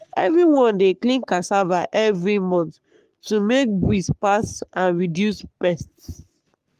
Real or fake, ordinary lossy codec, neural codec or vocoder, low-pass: fake; Opus, 32 kbps; codec, 44.1 kHz, 3.4 kbps, Pupu-Codec; 14.4 kHz